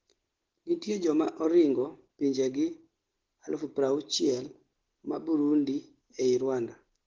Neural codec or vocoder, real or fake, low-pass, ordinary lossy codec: none; real; 7.2 kHz; Opus, 16 kbps